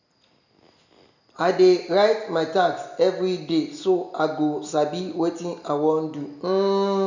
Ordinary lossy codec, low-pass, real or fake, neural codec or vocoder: none; 7.2 kHz; real; none